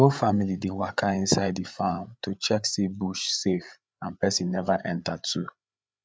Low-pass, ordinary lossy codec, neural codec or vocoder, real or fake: none; none; codec, 16 kHz, 8 kbps, FreqCodec, larger model; fake